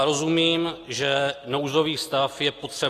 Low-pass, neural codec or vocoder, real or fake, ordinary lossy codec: 14.4 kHz; none; real; AAC, 48 kbps